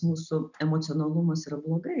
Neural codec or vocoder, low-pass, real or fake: none; 7.2 kHz; real